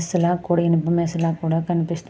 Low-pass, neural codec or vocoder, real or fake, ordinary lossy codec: none; none; real; none